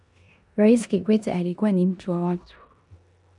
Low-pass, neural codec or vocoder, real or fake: 10.8 kHz; codec, 16 kHz in and 24 kHz out, 0.9 kbps, LongCat-Audio-Codec, four codebook decoder; fake